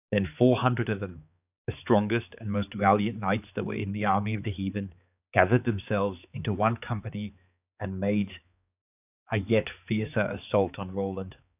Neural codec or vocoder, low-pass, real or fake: codec, 16 kHz, 4 kbps, X-Codec, HuBERT features, trained on balanced general audio; 3.6 kHz; fake